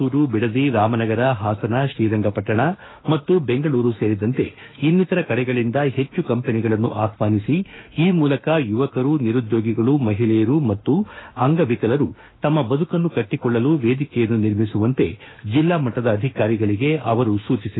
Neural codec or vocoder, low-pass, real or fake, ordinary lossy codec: autoencoder, 48 kHz, 32 numbers a frame, DAC-VAE, trained on Japanese speech; 7.2 kHz; fake; AAC, 16 kbps